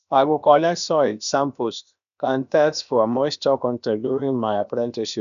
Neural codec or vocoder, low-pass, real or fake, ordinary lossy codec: codec, 16 kHz, about 1 kbps, DyCAST, with the encoder's durations; 7.2 kHz; fake; none